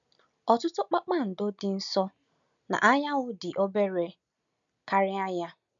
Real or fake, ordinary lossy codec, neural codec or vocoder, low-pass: real; none; none; 7.2 kHz